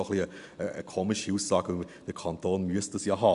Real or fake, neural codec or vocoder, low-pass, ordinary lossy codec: real; none; 10.8 kHz; none